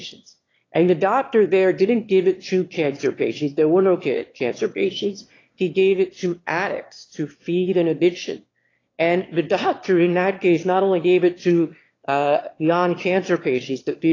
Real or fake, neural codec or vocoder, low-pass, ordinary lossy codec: fake; autoencoder, 22.05 kHz, a latent of 192 numbers a frame, VITS, trained on one speaker; 7.2 kHz; AAC, 32 kbps